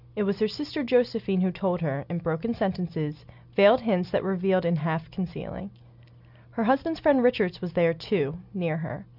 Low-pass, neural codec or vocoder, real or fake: 5.4 kHz; none; real